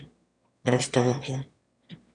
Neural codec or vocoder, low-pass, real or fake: autoencoder, 22.05 kHz, a latent of 192 numbers a frame, VITS, trained on one speaker; 9.9 kHz; fake